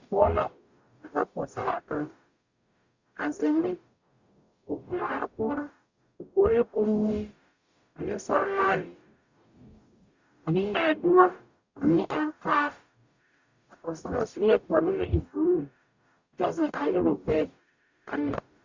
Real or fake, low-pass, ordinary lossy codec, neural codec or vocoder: fake; 7.2 kHz; Opus, 64 kbps; codec, 44.1 kHz, 0.9 kbps, DAC